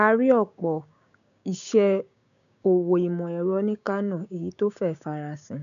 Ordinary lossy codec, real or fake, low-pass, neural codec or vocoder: none; fake; 7.2 kHz; codec, 16 kHz, 6 kbps, DAC